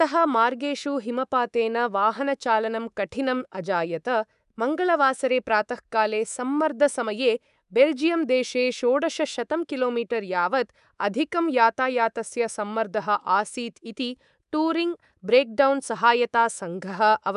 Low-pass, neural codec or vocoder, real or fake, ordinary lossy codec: 10.8 kHz; codec, 24 kHz, 3.1 kbps, DualCodec; fake; none